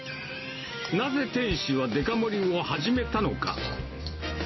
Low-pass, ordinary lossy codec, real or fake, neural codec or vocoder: 7.2 kHz; MP3, 24 kbps; real; none